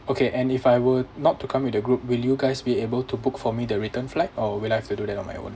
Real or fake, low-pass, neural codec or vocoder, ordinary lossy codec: real; none; none; none